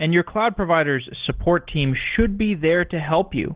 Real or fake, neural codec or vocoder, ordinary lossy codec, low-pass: real; none; Opus, 16 kbps; 3.6 kHz